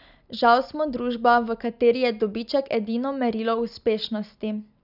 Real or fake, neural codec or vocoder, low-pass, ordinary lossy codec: real; none; 5.4 kHz; none